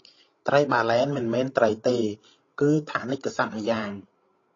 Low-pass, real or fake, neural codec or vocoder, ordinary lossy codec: 7.2 kHz; fake; codec, 16 kHz, 16 kbps, FreqCodec, larger model; AAC, 32 kbps